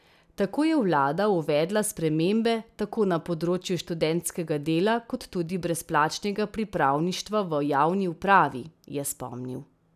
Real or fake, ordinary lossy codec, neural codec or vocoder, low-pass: real; none; none; 14.4 kHz